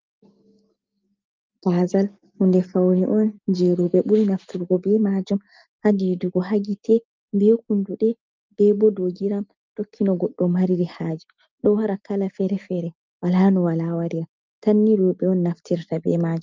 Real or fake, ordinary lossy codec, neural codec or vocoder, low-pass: real; Opus, 24 kbps; none; 7.2 kHz